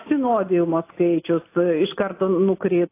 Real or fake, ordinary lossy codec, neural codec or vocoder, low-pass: real; AAC, 24 kbps; none; 3.6 kHz